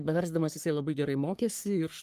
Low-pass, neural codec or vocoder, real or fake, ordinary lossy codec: 14.4 kHz; codec, 44.1 kHz, 3.4 kbps, Pupu-Codec; fake; Opus, 32 kbps